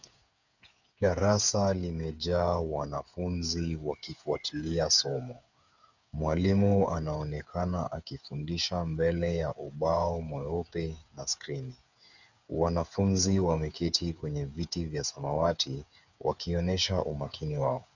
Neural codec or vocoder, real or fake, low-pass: codec, 16 kHz, 8 kbps, FreqCodec, smaller model; fake; 7.2 kHz